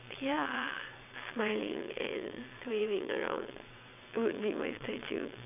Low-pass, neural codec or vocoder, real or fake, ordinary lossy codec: 3.6 kHz; vocoder, 22.05 kHz, 80 mel bands, WaveNeXt; fake; none